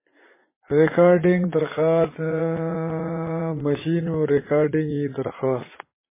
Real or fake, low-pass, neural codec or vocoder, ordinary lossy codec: fake; 3.6 kHz; vocoder, 44.1 kHz, 80 mel bands, Vocos; MP3, 16 kbps